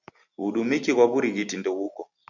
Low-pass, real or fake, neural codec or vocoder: 7.2 kHz; real; none